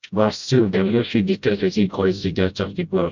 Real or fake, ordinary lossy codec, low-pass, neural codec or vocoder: fake; MP3, 64 kbps; 7.2 kHz; codec, 16 kHz, 0.5 kbps, FreqCodec, smaller model